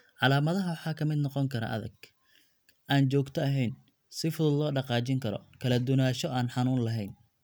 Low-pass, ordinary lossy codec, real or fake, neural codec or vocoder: none; none; real; none